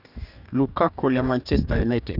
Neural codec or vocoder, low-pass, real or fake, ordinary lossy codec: codec, 44.1 kHz, 2.6 kbps, DAC; 5.4 kHz; fake; none